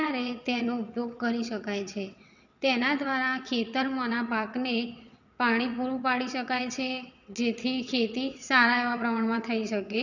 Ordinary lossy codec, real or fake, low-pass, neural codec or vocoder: none; fake; 7.2 kHz; vocoder, 22.05 kHz, 80 mel bands, WaveNeXt